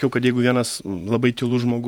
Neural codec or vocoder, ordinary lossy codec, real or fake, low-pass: vocoder, 48 kHz, 128 mel bands, Vocos; MP3, 96 kbps; fake; 19.8 kHz